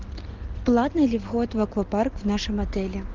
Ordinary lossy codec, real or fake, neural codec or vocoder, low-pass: Opus, 16 kbps; real; none; 7.2 kHz